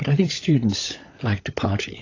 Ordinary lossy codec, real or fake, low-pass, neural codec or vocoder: AAC, 32 kbps; fake; 7.2 kHz; codec, 16 kHz, 16 kbps, FunCodec, trained on LibriTTS, 50 frames a second